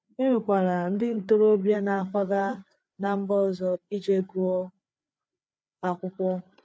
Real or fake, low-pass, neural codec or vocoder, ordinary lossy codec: fake; none; codec, 16 kHz, 4 kbps, FreqCodec, larger model; none